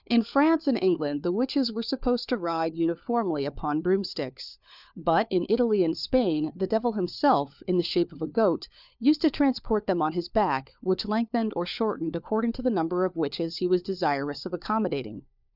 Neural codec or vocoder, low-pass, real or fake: codec, 16 kHz, 4 kbps, FunCodec, trained on LibriTTS, 50 frames a second; 5.4 kHz; fake